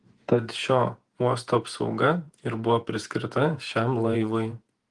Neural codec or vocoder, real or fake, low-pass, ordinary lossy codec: vocoder, 48 kHz, 128 mel bands, Vocos; fake; 10.8 kHz; Opus, 24 kbps